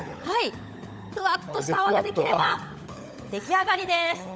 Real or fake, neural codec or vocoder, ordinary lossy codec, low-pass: fake; codec, 16 kHz, 16 kbps, FunCodec, trained on LibriTTS, 50 frames a second; none; none